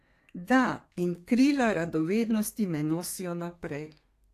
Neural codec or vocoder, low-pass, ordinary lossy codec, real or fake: codec, 32 kHz, 1.9 kbps, SNAC; 14.4 kHz; AAC, 64 kbps; fake